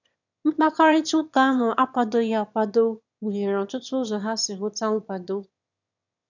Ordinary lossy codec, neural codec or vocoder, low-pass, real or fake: none; autoencoder, 22.05 kHz, a latent of 192 numbers a frame, VITS, trained on one speaker; 7.2 kHz; fake